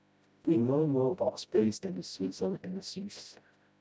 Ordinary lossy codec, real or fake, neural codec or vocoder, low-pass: none; fake; codec, 16 kHz, 0.5 kbps, FreqCodec, smaller model; none